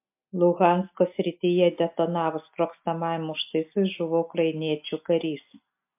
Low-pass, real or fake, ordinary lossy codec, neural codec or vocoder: 3.6 kHz; real; MP3, 32 kbps; none